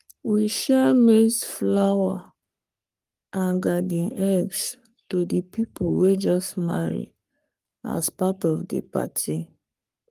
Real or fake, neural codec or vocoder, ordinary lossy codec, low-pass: fake; codec, 44.1 kHz, 3.4 kbps, Pupu-Codec; Opus, 32 kbps; 14.4 kHz